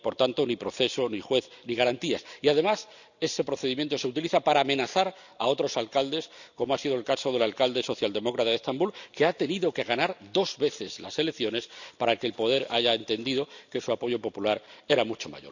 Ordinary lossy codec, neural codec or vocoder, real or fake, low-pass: none; none; real; 7.2 kHz